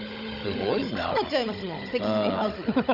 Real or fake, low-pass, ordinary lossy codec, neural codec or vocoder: fake; 5.4 kHz; none; codec, 16 kHz, 16 kbps, FunCodec, trained on Chinese and English, 50 frames a second